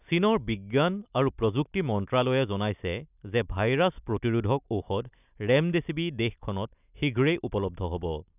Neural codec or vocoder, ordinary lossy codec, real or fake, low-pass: none; none; real; 3.6 kHz